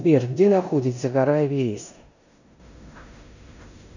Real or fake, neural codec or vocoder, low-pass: fake; codec, 16 kHz in and 24 kHz out, 0.9 kbps, LongCat-Audio-Codec, four codebook decoder; 7.2 kHz